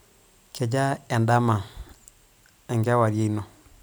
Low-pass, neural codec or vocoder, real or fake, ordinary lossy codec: none; none; real; none